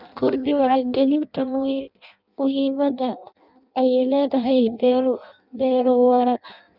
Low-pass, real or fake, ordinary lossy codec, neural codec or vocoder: 5.4 kHz; fake; none; codec, 16 kHz in and 24 kHz out, 0.6 kbps, FireRedTTS-2 codec